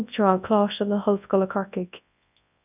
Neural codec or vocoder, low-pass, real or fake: codec, 24 kHz, 0.9 kbps, WavTokenizer, large speech release; 3.6 kHz; fake